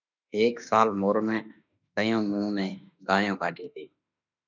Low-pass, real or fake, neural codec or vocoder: 7.2 kHz; fake; autoencoder, 48 kHz, 32 numbers a frame, DAC-VAE, trained on Japanese speech